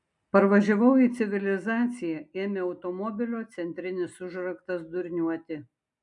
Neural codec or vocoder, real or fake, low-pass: none; real; 10.8 kHz